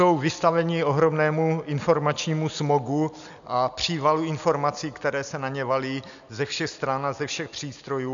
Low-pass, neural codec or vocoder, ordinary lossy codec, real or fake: 7.2 kHz; none; MP3, 96 kbps; real